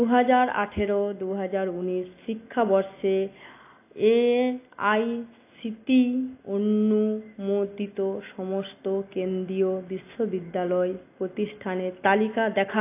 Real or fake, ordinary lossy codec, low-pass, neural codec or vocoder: real; AAC, 24 kbps; 3.6 kHz; none